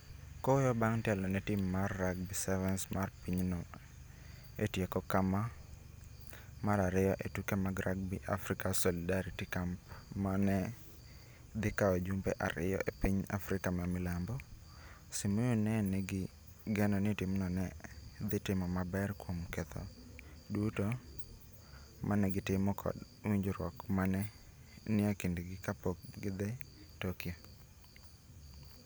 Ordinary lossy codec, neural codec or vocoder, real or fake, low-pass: none; none; real; none